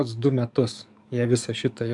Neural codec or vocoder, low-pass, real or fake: codec, 44.1 kHz, 7.8 kbps, DAC; 10.8 kHz; fake